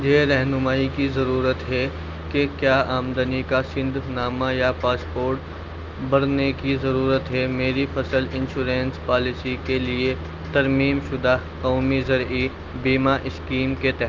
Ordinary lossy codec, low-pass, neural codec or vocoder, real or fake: Opus, 32 kbps; 7.2 kHz; none; real